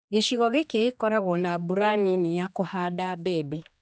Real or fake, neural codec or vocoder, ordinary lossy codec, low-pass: fake; codec, 16 kHz, 2 kbps, X-Codec, HuBERT features, trained on general audio; none; none